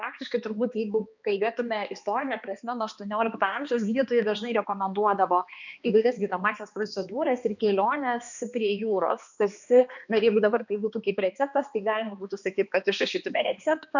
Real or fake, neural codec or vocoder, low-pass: fake; codec, 16 kHz, 2 kbps, X-Codec, HuBERT features, trained on balanced general audio; 7.2 kHz